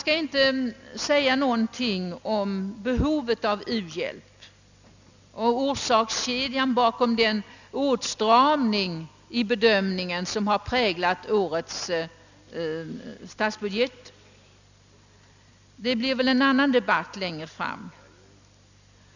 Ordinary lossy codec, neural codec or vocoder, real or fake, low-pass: none; none; real; 7.2 kHz